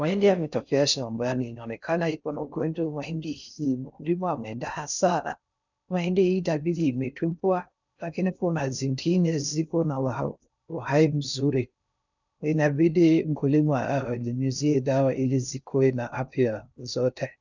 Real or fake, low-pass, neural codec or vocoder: fake; 7.2 kHz; codec, 16 kHz in and 24 kHz out, 0.6 kbps, FocalCodec, streaming, 4096 codes